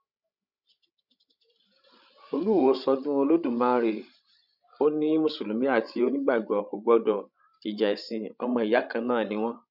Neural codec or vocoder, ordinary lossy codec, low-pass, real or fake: codec, 16 kHz, 16 kbps, FreqCodec, larger model; none; 5.4 kHz; fake